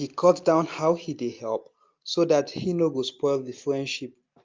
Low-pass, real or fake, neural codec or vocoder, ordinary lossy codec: 7.2 kHz; real; none; Opus, 24 kbps